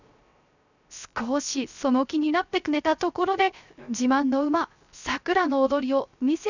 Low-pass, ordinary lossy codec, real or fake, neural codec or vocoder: 7.2 kHz; none; fake; codec, 16 kHz, 0.3 kbps, FocalCodec